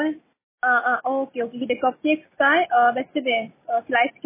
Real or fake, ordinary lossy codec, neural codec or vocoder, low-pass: real; MP3, 16 kbps; none; 3.6 kHz